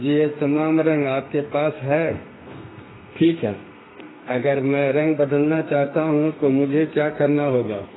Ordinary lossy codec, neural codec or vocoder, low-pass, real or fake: AAC, 16 kbps; codec, 32 kHz, 1.9 kbps, SNAC; 7.2 kHz; fake